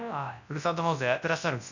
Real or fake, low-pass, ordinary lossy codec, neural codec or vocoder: fake; 7.2 kHz; none; codec, 24 kHz, 0.9 kbps, WavTokenizer, large speech release